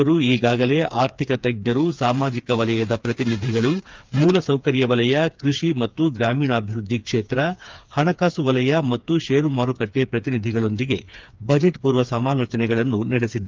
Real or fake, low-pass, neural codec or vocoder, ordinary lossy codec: fake; 7.2 kHz; codec, 16 kHz, 4 kbps, FreqCodec, smaller model; Opus, 24 kbps